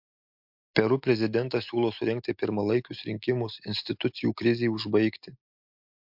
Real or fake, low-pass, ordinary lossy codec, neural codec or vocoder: real; 5.4 kHz; MP3, 48 kbps; none